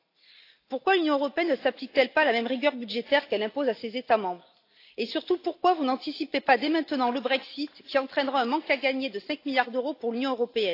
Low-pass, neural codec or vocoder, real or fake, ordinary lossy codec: 5.4 kHz; none; real; AAC, 32 kbps